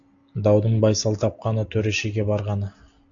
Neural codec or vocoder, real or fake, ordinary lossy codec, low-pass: none; real; AAC, 64 kbps; 7.2 kHz